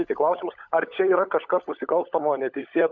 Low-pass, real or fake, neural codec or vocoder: 7.2 kHz; fake; codec, 16 kHz, 16 kbps, FunCodec, trained on LibriTTS, 50 frames a second